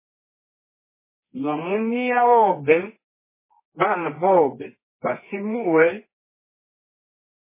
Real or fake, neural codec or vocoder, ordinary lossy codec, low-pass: fake; codec, 24 kHz, 0.9 kbps, WavTokenizer, medium music audio release; MP3, 16 kbps; 3.6 kHz